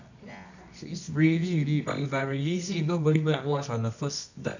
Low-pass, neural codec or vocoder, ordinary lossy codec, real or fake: 7.2 kHz; codec, 24 kHz, 0.9 kbps, WavTokenizer, medium music audio release; none; fake